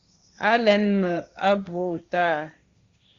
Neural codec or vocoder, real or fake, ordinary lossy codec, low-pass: codec, 16 kHz, 1.1 kbps, Voila-Tokenizer; fake; Opus, 64 kbps; 7.2 kHz